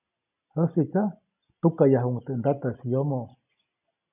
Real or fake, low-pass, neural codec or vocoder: real; 3.6 kHz; none